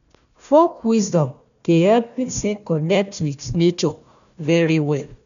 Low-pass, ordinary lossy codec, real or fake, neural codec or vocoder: 7.2 kHz; none; fake; codec, 16 kHz, 1 kbps, FunCodec, trained on Chinese and English, 50 frames a second